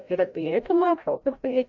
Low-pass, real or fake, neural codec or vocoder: 7.2 kHz; fake; codec, 16 kHz, 0.5 kbps, FreqCodec, larger model